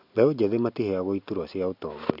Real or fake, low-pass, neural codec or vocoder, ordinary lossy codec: real; 5.4 kHz; none; none